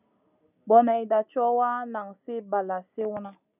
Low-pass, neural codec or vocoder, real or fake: 3.6 kHz; none; real